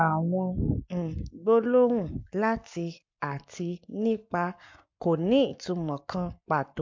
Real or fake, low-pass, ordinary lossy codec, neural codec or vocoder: fake; 7.2 kHz; MP3, 48 kbps; codec, 44.1 kHz, 7.8 kbps, Pupu-Codec